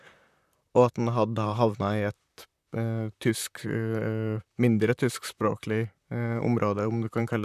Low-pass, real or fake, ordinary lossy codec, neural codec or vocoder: 14.4 kHz; fake; none; vocoder, 44.1 kHz, 128 mel bands, Pupu-Vocoder